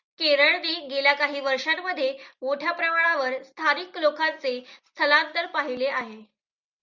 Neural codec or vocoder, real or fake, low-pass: none; real; 7.2 kHz